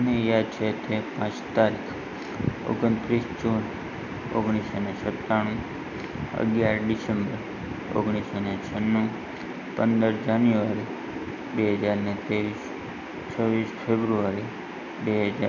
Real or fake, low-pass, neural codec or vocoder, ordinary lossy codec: real; 7.2 kHz; none; none